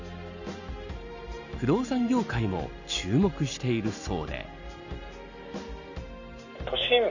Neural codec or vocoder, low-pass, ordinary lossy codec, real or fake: none; 7.2 kHz; none; real